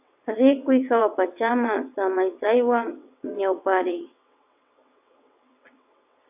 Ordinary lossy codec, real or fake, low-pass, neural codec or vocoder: AAC, 32 kbps; fake; 3.6 kHz; vocoder, 22.05 kHz, 80 mel bands, WaveNeXt